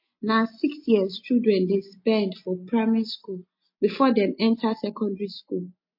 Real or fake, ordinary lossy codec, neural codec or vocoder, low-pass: real; MP3, 32 kbps; none; 5.4 kHz